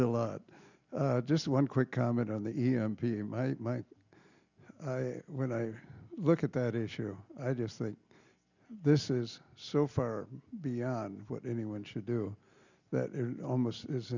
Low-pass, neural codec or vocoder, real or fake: 7.2 kHz; none; real